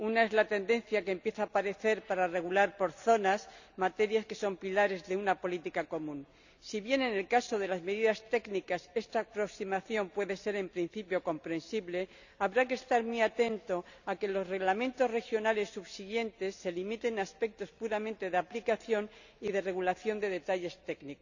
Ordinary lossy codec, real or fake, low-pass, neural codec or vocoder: none; real; 7.2 kHz; none